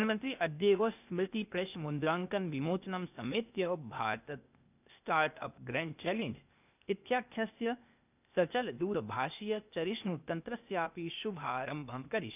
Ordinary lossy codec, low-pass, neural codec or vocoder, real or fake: none; 3.6 kHz; codec, 16 kHz, 0.8 kbps, ZipCodec; fake